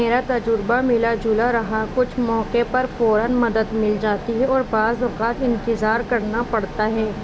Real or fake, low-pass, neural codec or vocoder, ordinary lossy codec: real; none; none; none